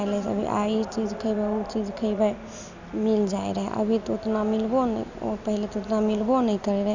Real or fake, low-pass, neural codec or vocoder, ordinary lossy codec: real; 7.2 kHz; none; none